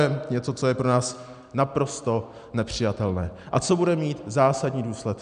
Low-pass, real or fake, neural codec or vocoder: 9.9 kHz; real; none